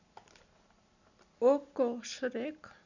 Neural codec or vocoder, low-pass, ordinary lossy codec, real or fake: none; 7.2 kHz; none; real